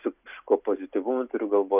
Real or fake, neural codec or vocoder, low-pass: real; none; 3.6 kHz